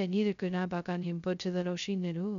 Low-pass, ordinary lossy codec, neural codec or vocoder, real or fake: 7.2 kHz; none; codec, 16 kHz, 0.2 kbps, FocalCodec; fake